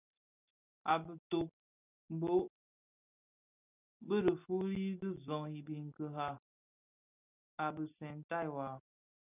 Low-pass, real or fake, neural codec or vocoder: 3.6 kHz; real; none